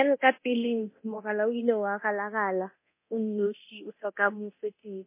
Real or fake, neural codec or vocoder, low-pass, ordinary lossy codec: fake; codec, 24 kHz, 0.9 kbps, DualCodec; 3.6 kHz; MP3, 24 kbps